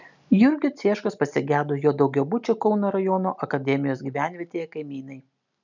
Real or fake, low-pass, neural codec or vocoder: real; 7.2 kHz; none